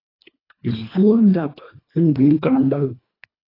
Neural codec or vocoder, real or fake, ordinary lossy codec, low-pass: codec, 24 kHz, 1.5 kbps, HILCodec; fake; AAC, 24 kbps; 5.4 kHz